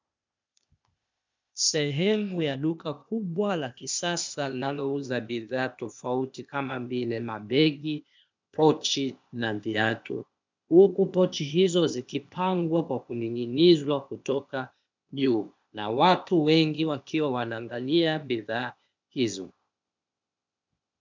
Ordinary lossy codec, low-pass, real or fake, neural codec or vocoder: MP3, 64 kbps; 7.2 kHz; fake; codec, 16 kHz, 0.8 kbps, ZipCodec